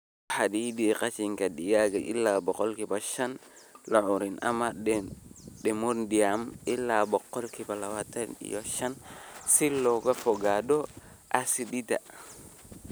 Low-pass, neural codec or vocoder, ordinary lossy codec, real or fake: none; none; none; real